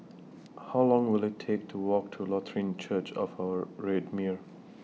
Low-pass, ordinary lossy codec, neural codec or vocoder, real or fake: none; none; none; real